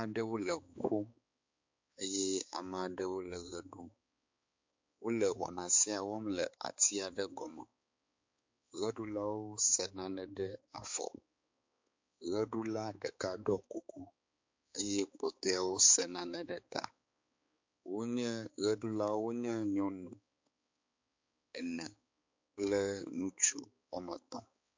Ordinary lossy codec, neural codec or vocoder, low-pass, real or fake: MP3, 48 kbps; codec, 16 kHz, 4 kbps, X-Codec, HuBERT features, trained on balanced general audio; 7.2 kHz; fake